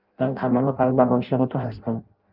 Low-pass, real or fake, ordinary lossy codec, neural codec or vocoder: 5.4 kHz; fake; Opus, 24 kbps; codec, 16 kHz in and 24 kHz out, 0.6 kbps, FireRedTTS-2 codec